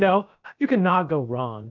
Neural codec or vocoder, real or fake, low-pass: codec, 16 kHz, about 1 kbps, DyCAST, with the encoder's durations; fake; 7.2 kHz